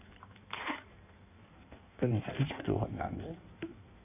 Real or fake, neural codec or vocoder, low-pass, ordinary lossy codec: fake; codec, 16 kHz in and 24 kHz out, 1.1 kbps, FireRedTTS-2 codec; 3.6 kHz; none